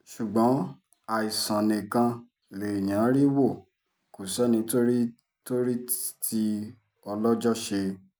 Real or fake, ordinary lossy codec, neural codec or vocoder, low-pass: real; none; none; none